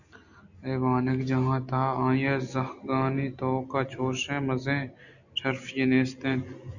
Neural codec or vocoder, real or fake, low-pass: none; real; 7.2 kHz